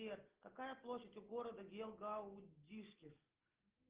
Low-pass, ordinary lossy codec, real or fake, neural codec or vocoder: 3.6 kHz; Opus, 16 kbps; real; none